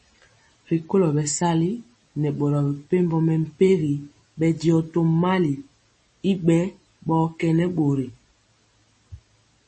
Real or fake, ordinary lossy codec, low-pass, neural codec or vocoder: real; MP3, 32 kbps; 10.8 kHz; none